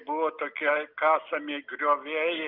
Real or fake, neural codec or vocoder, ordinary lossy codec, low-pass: real; none; Opus, 64 kbps; 5.4 kHz